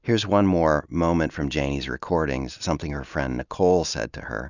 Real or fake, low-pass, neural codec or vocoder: real; 7.2 kHz; none